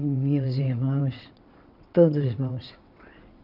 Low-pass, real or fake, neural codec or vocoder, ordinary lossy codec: 5.4 kHz; fake; vocoder, 22.05 kHz, 80 mel bands, WaveNeXt; none